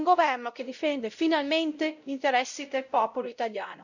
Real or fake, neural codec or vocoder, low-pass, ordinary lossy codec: fake; codec, 16 kHz, 0.5 kbps, X-Codec, WavLM features, trained on Multilingual LibriSpeech; 7.2 kHz; none